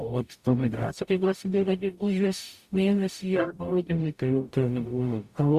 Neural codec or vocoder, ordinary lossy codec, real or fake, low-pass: codec, 44.1 kHz, 0.9 kbps, DAC; Opus, 64 kbps; fake; 14.4 kHz